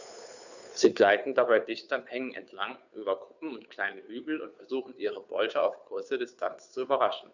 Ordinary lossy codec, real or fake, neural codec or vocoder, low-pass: none; fake; codec, 16 kHz, 2 kbps, FunCodec, trained on Chinese and English, 25 frames a second; 7.2 kHz